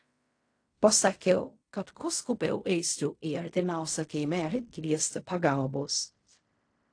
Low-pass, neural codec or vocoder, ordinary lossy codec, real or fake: 9.9 kHz; codec, 16 kHz in and 24 kHz out, 0.4 kbps, LongCat-Audio-Codec, fine tuned four codebook decoder; AAC, 48 kbps; fake